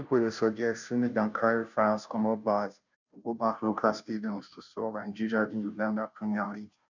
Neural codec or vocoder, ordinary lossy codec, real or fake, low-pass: codec, 16 kHz, 0.5 kbps, FunCodec, trained on Chinese and English, 25 frames a second; none; fake; 7.2 kHz